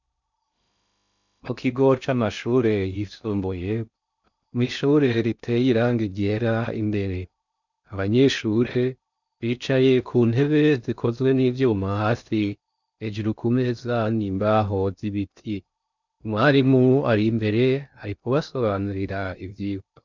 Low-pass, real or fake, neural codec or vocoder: 7.2 kHz; fake; codec, 16 kHz in and 24 kHz out, 0.6 kbps, FocalCodec, streaming, 2048 codes